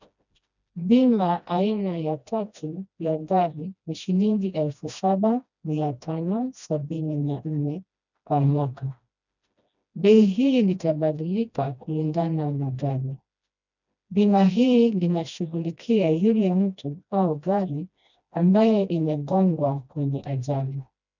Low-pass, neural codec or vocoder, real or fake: 7.2 kHz; codec, 16 kHz, 1 kbps, FreqCodec, smaller model; fake